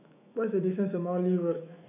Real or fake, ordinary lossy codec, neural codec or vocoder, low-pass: fake; none; autoencoder, 48 kHz, 128 numbers a frame, DAC-VAE, trained on Japanese speech; 3.6 kHz